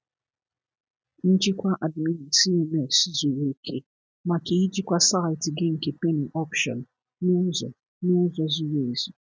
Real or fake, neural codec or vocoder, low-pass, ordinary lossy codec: real; none; none; none